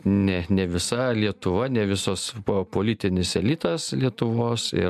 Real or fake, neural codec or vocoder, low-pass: real; none; 14.4 kHz